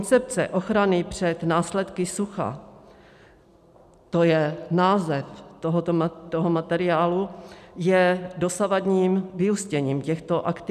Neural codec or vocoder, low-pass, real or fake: none; 14.4 kHz; real